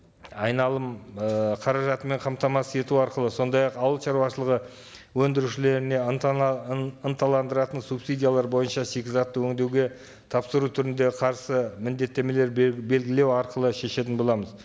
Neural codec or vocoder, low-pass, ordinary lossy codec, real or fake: none; none; none; real